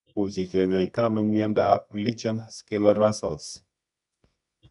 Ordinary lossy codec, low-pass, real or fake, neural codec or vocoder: MP3, 96 kbps; 10.8 kHz; fake; codec, 24 kHz, 0.9 kbps, WavTokenizer, medium music audio release